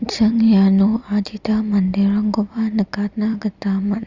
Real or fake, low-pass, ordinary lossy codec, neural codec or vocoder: fake; 7.2 kHz; Opus, 64 kbps; vocoder, 44.1 kHz, 128 mel bands every 256 samples, BigVGAN v2